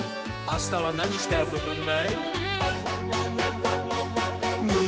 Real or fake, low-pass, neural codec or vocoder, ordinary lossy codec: fake; none; codec, 16 kHz, 2 kbps, X-Codec, HuBERT features, trained on general audio; none